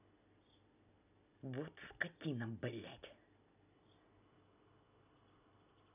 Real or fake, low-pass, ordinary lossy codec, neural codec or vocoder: real; 3.6 kHz; none; none